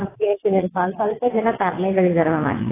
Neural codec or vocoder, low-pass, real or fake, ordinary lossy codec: vocoder, 22.05 kHz, 80 mel bands, WaveNeXt; 3.6 kHz; fake; AAC, 16 kbps